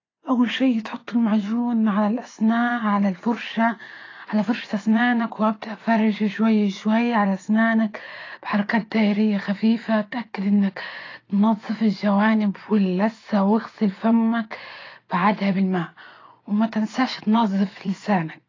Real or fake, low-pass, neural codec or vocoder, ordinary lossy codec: fake; 7.2 kHz; vocoder, 24 kHz, 100 mel bands, Vocos; AAC, 32 kbps